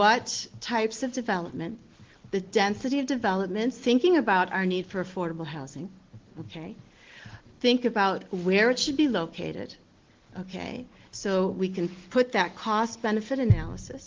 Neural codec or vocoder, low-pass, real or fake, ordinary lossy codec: none; 7.2 kHz; real; Opus, 16 kbps